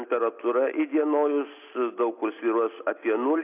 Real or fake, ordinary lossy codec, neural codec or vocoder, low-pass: real; AAC, 32 kbps; none; 3.6 kHz